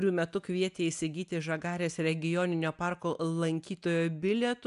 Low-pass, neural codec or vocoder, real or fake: 10.8 kHz; none; real